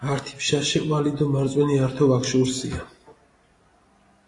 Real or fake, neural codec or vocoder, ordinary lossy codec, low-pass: real; none; AAC, 32 kbps; 10.8 kHz